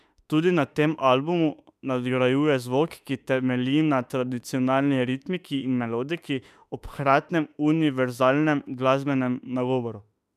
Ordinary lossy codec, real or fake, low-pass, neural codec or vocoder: none; fake; 14.4 kHz; autoencoder, 48 kHz, 32 numbers a frame, DAC-VAE, trained on Japanese speech